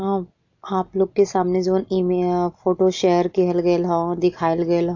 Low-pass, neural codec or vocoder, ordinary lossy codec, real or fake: 7.2 kHz; none; AAC, 48 kbps; real